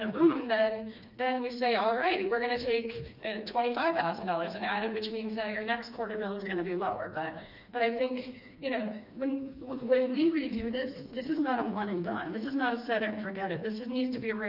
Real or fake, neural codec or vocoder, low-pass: fake; codec, 16 kHz, 2 kbps, FreqCodec, smaller model; 5.4 kHz